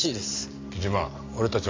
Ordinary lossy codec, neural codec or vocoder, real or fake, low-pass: AAC, 32 kbps; none; real; 7.2 kHz